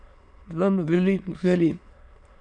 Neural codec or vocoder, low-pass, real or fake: autoencoder, 22.05 kHz, a latent of 192 numbers a frame, VITS, trained on many speakers; 9.9 kHz; fake